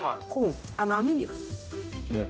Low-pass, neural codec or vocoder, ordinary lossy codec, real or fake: none; codec, 16 kHz, 0.5 kbps, X-Codec, HuBERT features, trained on general audio; none; fake